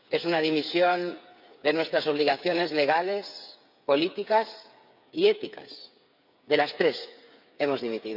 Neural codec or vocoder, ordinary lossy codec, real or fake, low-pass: codec, 16 kHz, 8 kbps, FreqCodec, smaller model; none; fake; 5.4 kHz